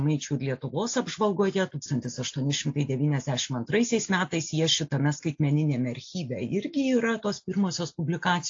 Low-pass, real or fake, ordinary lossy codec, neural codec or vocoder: 7.2 kHz; real; AAC, 48 kbps; none